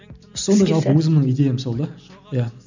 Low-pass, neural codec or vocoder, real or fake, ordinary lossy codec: 7.2 kHz; none; real; none